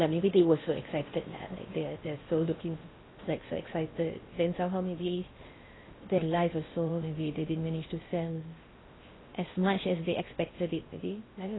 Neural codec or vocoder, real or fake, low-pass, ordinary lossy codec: codec, 16 kHz in and 24 kHz out, 0.6 kbps, FocalCodec, streaming, 4096 codes; fake; 7.2 kHz; AAC, 16 kbps